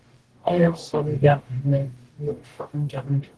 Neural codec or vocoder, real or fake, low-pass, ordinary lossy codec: codec, 44.1 kHz, 0.9 kbps, DAC; fake; 10.8 kHz; Opus, 16 kbps